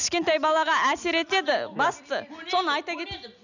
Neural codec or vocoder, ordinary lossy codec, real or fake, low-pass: none; none; real; 7.2 kHz